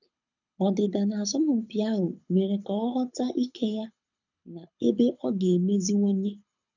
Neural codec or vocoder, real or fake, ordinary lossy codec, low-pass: codec, 24 kHz, 6 kbps, HILCodec; fake; none; 7.2 kHz